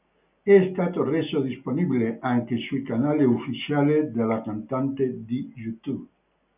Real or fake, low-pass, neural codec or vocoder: real; 3.6 kHz; none